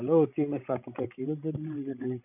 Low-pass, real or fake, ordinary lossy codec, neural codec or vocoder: 3.6 kHz; fake; none; codec, 16 kHz, 16 kbps, FreqCodec, larger model